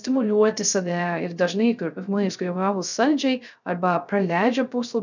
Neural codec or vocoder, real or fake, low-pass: codec, 16 kHz, 0.3 kbps, FocalCodec; fake; 7.2 kHz